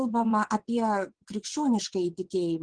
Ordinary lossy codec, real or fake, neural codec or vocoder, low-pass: Opus, 16 kbps; fake; vocoder, 22.05 kHz, 80 mel bands, WaveNeXt; 9.9 kHz